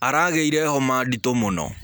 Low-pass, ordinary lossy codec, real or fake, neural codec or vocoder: none; none; real; none